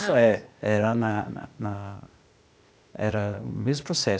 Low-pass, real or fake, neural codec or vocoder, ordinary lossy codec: none; fake; codec, 16 kHz, 0.8 kbps, ZipCodec; none